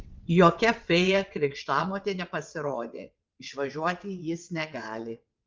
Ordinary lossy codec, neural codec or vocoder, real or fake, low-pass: Opus, 32 kbps; vocoder, 22.05 kHz, 80 mel bands, WaveNeXt; fake; 7.2 kHz